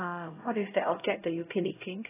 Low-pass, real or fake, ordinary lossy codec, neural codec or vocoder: 3.6 kHz; fake; AAC, 16 kbps; codec, 16 kHz, 0.5 kbps, X-Codec, HuBERT features, trained on LibriSpeech